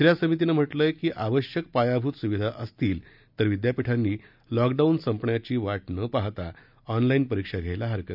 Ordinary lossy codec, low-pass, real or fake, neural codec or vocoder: none; 5.4 kHz; real; none